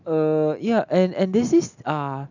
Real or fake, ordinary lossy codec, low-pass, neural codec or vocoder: real; none; 7.2 kHz; none